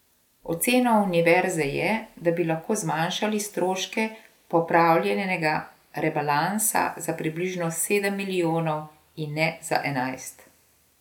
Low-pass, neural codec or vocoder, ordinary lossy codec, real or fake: 19.8 kHz; none; none; real